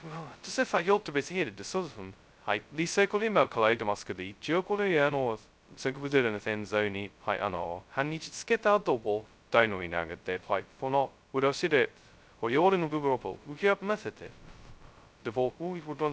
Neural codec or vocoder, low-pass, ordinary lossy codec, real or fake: codec, 16 kHz, 0.2 kbps, FocalCodec; none; none; fake